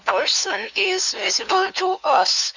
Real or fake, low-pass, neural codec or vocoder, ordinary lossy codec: fake; 7.2 kHz; codec, 16 kHz, 2 kbps, FunCodec, trained on LibriTTS, 25 frames a second; none